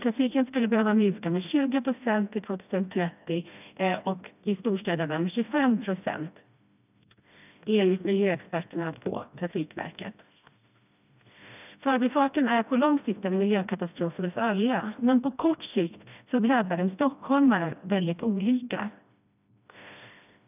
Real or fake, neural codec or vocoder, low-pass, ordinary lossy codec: fake; codec, 16 kHz, 1 kbps, FreqCodec, smaller model; 3.6 kHz; none